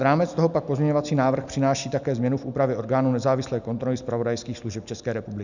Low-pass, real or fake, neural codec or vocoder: 7.2 kHz; real; none